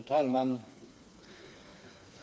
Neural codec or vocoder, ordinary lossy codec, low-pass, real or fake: codec, 16 kHz, 4 kbps, FreqCodec, smaller model; none; none; fake